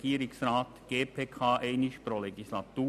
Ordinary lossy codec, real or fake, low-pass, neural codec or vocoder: none; real; 14.4 kHz; none